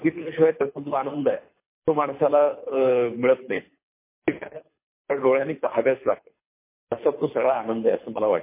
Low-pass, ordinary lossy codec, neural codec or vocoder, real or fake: 3.6 kHz; MP3, 24 kbps; codec, 24 kHz, 6 kbps, HILCodec; fake